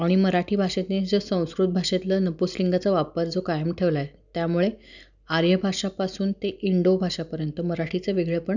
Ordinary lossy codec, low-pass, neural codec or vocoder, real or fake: none; 7.2 kHz; none; real